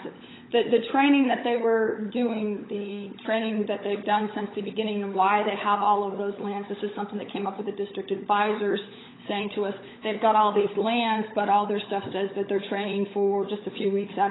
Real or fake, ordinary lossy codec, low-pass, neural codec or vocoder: fake; AAC, 16 kbps; 7.2 kHz; codec, 16 kHz, 16 kbps, FunCodec, trained on LibriTTS, 50 frames a second